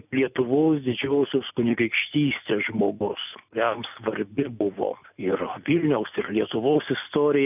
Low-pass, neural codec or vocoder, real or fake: 3.6 kHz; none; real